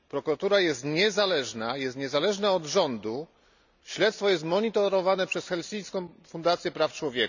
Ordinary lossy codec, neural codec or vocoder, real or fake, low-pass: none; none; real; 7.2 kHz